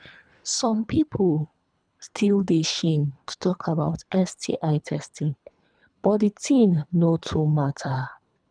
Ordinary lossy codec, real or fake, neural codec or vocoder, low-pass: none; fake; codec, 24 kHz, 3 kbps, HILCodec; 9.9 kHz